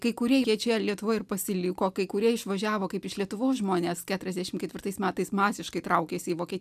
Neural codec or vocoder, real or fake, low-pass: none; real; 14.4 kHz